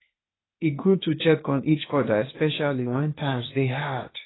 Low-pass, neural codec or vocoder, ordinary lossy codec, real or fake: 7.2 kHz; codec, 16 kHz, 0.8 kbps, ZipCodec; AAC, 16 kbps; fake